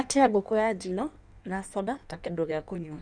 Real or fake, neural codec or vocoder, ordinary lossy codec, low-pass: fake; codec, 16 kHz in and 24 kHz out, 1.1 kbps, FireRedTTS-2 codec; none; 9.9 kHz